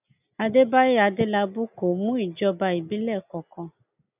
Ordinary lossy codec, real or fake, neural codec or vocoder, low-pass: none; real; none; 3.6 kHz